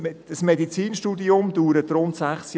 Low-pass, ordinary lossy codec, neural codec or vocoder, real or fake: none; none; none; real